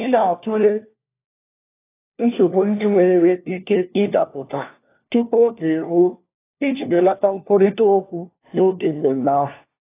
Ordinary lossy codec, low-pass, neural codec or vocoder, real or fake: AAC, 24 kbps; 3.6 kHz; codec, 16 kHz, 1 kbps, FunCodec, trained on LibriTTS, 50 frames a second; fake